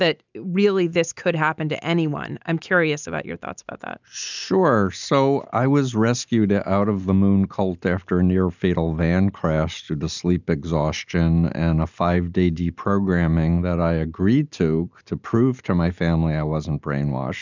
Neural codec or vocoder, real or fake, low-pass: none; real; 7.2 kHz